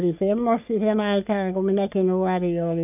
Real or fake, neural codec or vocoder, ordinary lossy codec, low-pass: fake; codec, 44.1 kHz, 3.4 kbps, Pupu-Codec; none; 3.6 kHz